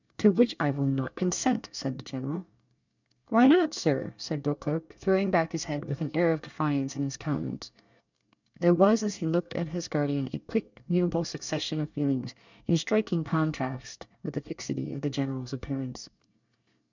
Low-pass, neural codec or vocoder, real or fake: 7.2 kHz; codec, 24 kHz, 1 kbps, SNAC; fake